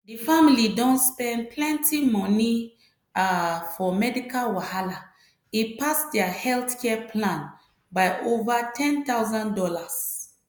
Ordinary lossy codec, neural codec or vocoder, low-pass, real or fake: none; none; none; real